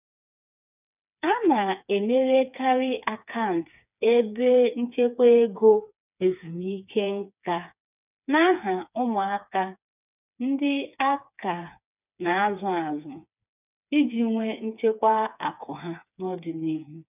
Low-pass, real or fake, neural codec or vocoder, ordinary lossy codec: 3.6 kHz; fake; codec, 16 kHz, 4 kbps, FreqCodec, smaller model; none